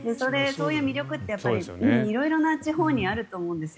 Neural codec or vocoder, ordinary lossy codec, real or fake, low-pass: none; none; real; none